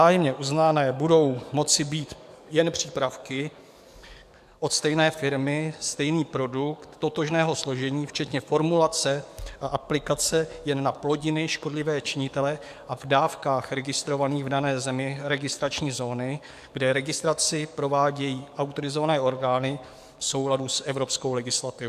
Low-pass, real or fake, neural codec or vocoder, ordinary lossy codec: 14.4 kHz; fake; codec, 44.1 kHz, 7.8 kbps, DAC; MP3, 96 kbps